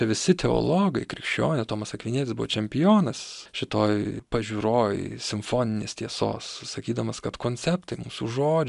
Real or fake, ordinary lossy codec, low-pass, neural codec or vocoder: real; MP3, 96 kbps; 10.8 kHz; none